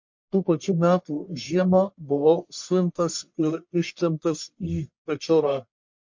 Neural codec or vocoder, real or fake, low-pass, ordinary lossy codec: codec, 44.1 kHz, 1.7 kbps, Pupu-Codec; fake; 7.2 kHz; MP3, 48 kbps